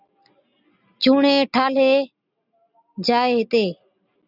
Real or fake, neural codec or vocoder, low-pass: real; none; 5.4 kHz